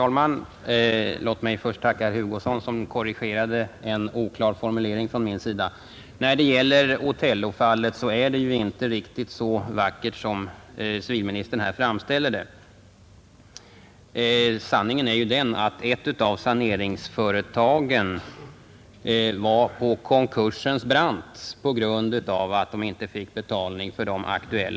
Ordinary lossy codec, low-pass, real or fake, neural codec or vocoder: none; none; real; none